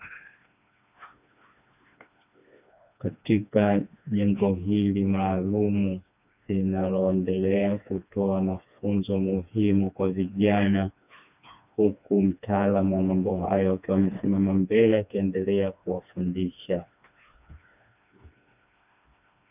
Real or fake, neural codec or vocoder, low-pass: fake; codec, 16 kHz, 2 kbps, FreqCodec, smaller model; 3.6 kHz